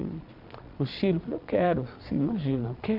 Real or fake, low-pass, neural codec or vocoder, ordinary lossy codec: fake; 5.4 kHz; vocoder, 22.05 kHz, 80 mel bands, Vocos; none